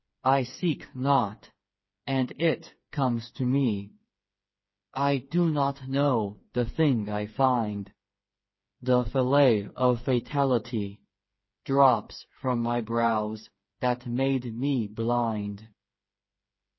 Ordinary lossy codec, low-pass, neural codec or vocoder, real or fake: MP3, 24 kbps; 7.2 kHz; codec, 16 kHz, 4 kbps, FreqCodec, smaller model; fake